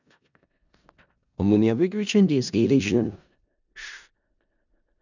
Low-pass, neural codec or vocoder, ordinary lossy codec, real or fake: 7.2 kHz; codec, 16 kHz in and 24 kHz out, 0.4 kbps, LongCat-Audio-Codec, four codebook decoder; none; fake